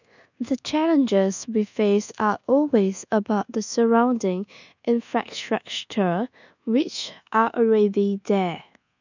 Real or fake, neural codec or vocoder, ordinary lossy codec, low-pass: fake; codec, 24 kHz, 1.2 kbps, DualCodec; none; 7.2 kHz